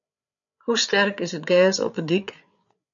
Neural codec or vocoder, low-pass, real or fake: codec, 16 kHz, 8 kbps, FreqCodec, larger model; 7.2 kHz; fake